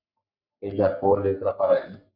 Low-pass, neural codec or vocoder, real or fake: 5.4 kHz; codec, 44.1 kHz, 3.4 kbps, Pupu-Codec; fake